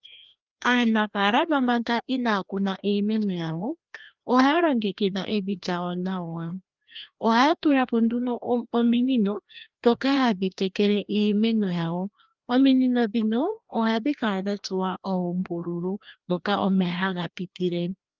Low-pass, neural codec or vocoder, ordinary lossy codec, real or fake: 7.2 kHz; codec, 16 kHz, 1 kbps, FreqCodec, larger model; Opus, 32 kbps; fake